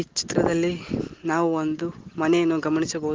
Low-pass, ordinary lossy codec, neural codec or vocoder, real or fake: 7.2 kHz; Opus, 16 kbps; none; real